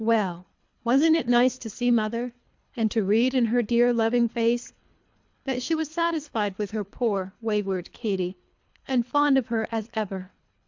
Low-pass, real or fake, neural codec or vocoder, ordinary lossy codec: 7.2 kHz; fake; codec, 24 kHz, 3 kbps, HILCodec; MP3, 64 kbps